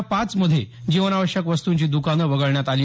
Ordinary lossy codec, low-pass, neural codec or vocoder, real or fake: none; none; none; real